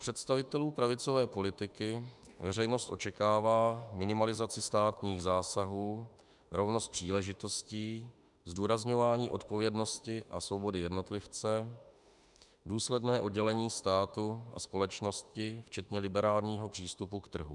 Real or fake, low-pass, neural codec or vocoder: fake; 10.8 kHz; autoencoder, 48 kHz, 32 numbers a frame, DAC-VAE, trained on Japanese speech